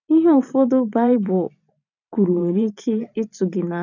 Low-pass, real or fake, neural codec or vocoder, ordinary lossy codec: 7.2 kHz; fake; vocoder, 44.1 kHz, 128 mel bands every 512 samples, BigVGAN v2; none